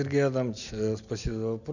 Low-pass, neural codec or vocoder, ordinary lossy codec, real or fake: 7.2 kHz; none; none; real